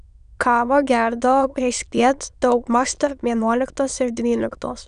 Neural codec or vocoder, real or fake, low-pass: autoencoder, 22.05 kHz, a latent of 192 numbers a frame, VITS, trained on many speakers; fake; 9.9 kHz